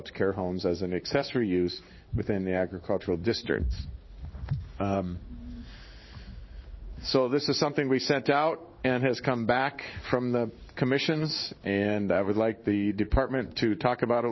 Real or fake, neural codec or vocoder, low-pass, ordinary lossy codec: fake; codec, 16 kHz in and 24 kHz out, 1 kbps, XY-Tokenizer; 7.2 kHz; MP3, 24 kbps